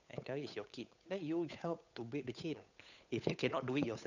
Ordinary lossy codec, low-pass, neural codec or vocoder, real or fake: none; 7.2 kHz; codec, 16 kHz, 8 kbps, FunCodec, trained on Chinese and English, 25 frames a second; fake